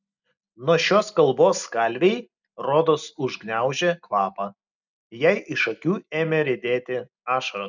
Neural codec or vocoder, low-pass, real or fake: none; 7.2 kHz; real